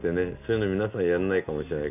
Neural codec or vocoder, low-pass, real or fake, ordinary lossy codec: none; 3.6 kHz; real; none